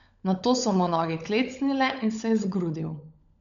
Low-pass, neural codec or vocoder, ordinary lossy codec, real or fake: 7.2 kHz; codec, 16 kHz, 16 kbps, FunCodec, trained on LibriTTS, 50 frames a second; none; fake